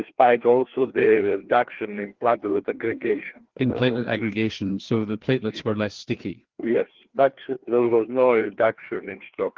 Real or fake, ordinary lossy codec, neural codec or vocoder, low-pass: fake; Opus, 16 kbps; codec, 16 kHz, 2 kbps, FreqCodec, larger model; 7.2 kHz